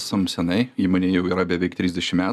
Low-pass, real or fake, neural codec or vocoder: 14.4 kHz; real; none